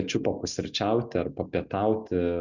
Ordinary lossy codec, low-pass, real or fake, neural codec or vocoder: Opus, 64 kbps; 7.2 kHz; real; none